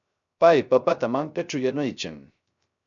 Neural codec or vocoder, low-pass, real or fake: codec, 16 kHz, 0.3 kbps, FocalCodec; 7.2 kHz; fake